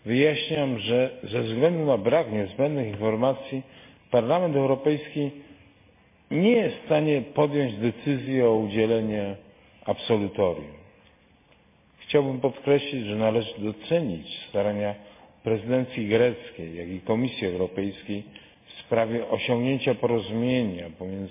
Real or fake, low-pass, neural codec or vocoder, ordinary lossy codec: real; 3.6 kHz; none; MP3, 24 kbps